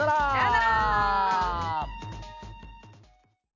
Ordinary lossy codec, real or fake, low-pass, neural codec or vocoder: none; real; 7.2 kHz; none